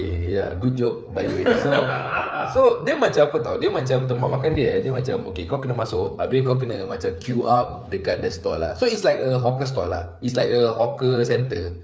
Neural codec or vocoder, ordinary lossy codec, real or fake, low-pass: codec, 16 kHz, 4 kbps, FreqCodec, larger model; none; fake; none